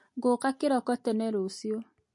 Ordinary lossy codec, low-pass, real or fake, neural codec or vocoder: MP3, 48 kbps; 10.8 kHz; real; none